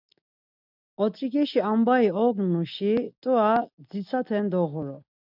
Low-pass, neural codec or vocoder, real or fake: 5.4 kHz; none; real